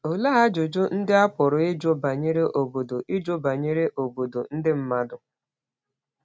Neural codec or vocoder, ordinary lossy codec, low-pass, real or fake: none; none; none; real